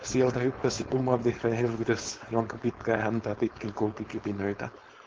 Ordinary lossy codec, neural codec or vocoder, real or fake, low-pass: Opus, 16 kbps; codec, 16 kHz, 4.8 kbps, FACodec; fake; 7.2 kHz